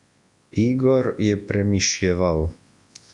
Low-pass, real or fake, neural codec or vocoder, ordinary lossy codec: 10.8 kHz; fake; codec, 24 kHz, 0.9 kbps, WavTokenizer, large speech release; MP3, 64 kbps